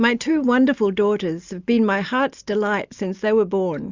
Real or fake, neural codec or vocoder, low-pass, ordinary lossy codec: real; none; 7.2 kHz; Opus, 64 kbps